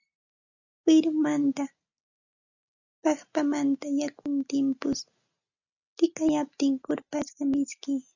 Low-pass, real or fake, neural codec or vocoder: 7.2 kHz; real; none